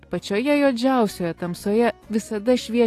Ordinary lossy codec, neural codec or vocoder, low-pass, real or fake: AAC, 48 kbps; none; 14.4 kHz; real